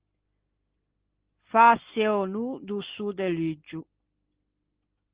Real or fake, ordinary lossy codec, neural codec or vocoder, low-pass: real; Opus, 16 kbps; none; 3.6 kHz